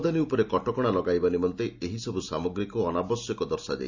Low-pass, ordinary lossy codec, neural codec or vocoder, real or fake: 7.2 kHz; Opus, 64 kbps; none; real